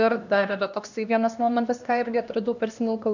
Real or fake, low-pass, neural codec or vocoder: fake; 7.2 kHz; codec, 16 kHz, 1 kbps, X-Codec, HuBERT features, trained on LibriSpeech